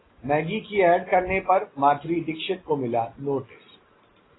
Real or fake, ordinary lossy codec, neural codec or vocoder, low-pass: real; AAC, 16 kbps; none; 7.2 kHz